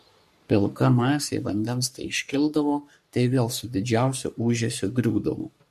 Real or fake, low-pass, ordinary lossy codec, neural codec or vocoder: fake; 14.4 kHz; MP3, 64 kbps; codec, 44.1 kHz, 3.4 kbps, Pupu-Codec